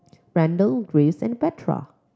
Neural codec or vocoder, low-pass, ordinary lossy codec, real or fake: none; none; none; real